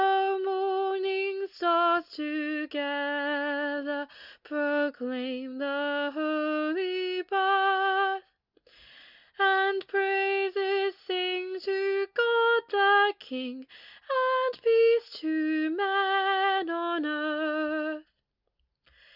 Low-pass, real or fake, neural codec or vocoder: 5.4 kHz; real; none